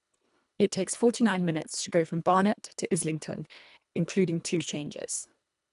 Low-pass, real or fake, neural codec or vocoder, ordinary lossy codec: 10.8 kHz; fake; codec, 24 kHz, 1.5 kbps, HILCodec; none